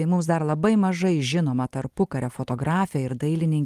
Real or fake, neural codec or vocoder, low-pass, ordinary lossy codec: real; none; 14.4 kHz; Opus, 24 kbps